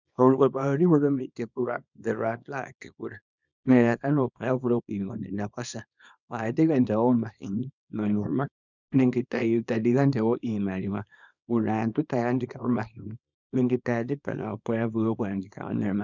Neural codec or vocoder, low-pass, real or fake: codec, 24 kHz, 0.9 kbps, WavTokenizer, small release; 7.2 kHz; fake